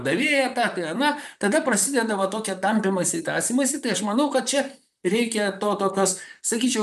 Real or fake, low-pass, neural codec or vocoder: fake; 14.4 kHz; vocoder, 44.1 kHz, 128 mel bands, Pupu-Vocoder